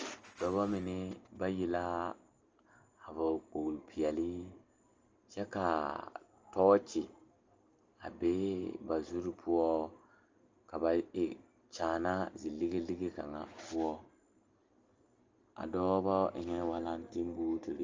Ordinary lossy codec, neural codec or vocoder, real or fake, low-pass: Opus, 24 kbps; none; real; 7.2 kHz